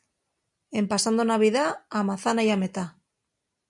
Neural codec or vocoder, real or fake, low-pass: none; real; 10.8 kHz